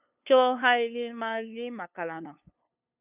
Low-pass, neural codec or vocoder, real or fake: 3.6 kHz; codec, 16 kHz, 2 kbps, FunCodec, trained on LibriTTS, 25 frames a second; fake